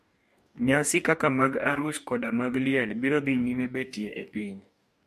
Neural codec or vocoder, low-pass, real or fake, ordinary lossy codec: codec, 44.1 kHz, 2.6 kbps, DAC; 14.4 kHz; fake; MP3, 64 kbps